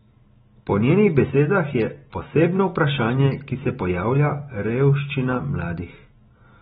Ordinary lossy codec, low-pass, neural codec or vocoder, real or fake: AAC, 16 kbps; 7.2 kHz; none; real